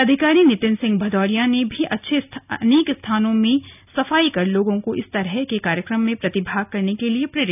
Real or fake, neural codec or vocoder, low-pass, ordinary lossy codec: real; none; 3.6 kHz; none